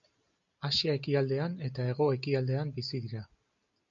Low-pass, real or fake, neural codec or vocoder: 7.2 kHz; real; none